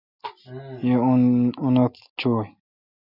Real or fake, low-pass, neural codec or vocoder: real; 5.4 kHz; none